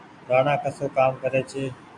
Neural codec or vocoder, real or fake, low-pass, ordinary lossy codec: none; real; 10.8 kHz; MP3, 64 kbps